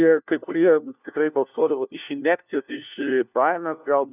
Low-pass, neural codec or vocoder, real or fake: 3.6 kHz; codec, 16 kHz, 1 kbps, FunCodec, trained on LibriTTS, 50 frames a second; fake